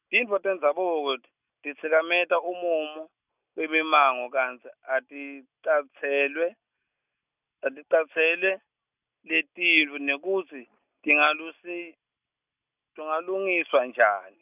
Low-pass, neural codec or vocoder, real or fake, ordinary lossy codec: 3.6 kHz; none; real; none